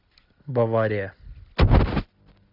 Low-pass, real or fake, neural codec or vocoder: 5.4 kHz; real; none